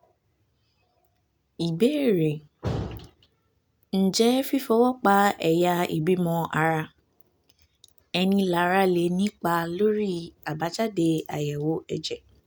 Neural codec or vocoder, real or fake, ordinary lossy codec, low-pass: none; real; none; none